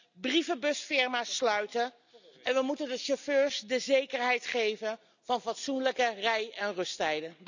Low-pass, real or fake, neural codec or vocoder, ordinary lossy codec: 7.2 kHz; real; none; none